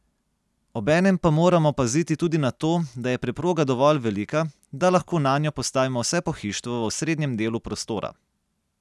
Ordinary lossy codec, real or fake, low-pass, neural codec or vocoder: none; real; none; none